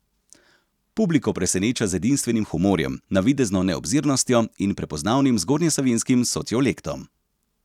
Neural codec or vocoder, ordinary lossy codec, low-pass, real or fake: none; none; 19.8 kHz; real